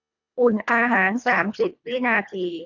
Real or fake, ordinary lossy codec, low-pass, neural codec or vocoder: fake; none; 7.2 kHz; codec, 24 kHz, 1.5 kbps, HILCodec